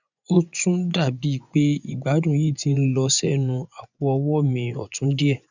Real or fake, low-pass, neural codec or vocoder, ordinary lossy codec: fake; 7.2 kHz; vocoder, 24 kHz, 100 mel bands, Vocos; none